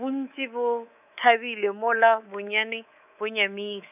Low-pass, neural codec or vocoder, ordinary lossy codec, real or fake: 3.6 kHz; codec, 24 kHz, 3.1 kbps, DualCodec; none; fake